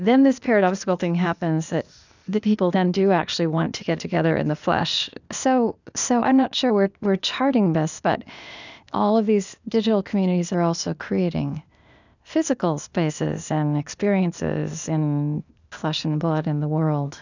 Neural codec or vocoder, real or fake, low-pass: codec, 16 kHz, 0.8 kbps, ZipCodec; fake; 7.2 kHz